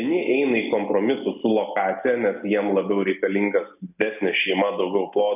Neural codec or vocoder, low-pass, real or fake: none; 3.6 kHz; real